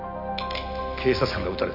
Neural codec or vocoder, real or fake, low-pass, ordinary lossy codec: none; real; 5.4 kHz; none